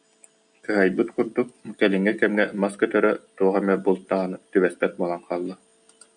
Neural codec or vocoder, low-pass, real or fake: none; 9.9 kHz; real